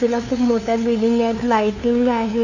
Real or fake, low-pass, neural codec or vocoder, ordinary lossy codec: fake; 7.2 kHz; codec, 16 kHz, 2 kbps, FunCodec, trained on LibriTTS, 25 frames a second; none